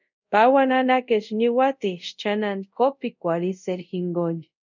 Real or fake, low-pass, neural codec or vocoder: fake; 7.2 kHz; codec, 24 kHz, 0.5 kbps, DualCodec